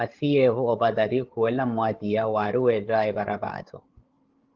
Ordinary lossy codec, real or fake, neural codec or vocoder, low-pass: Opus, 16 kbps; fake; codec, 16 kHz, 8 kbps, FreqCodec, larger model; 7.2 kHz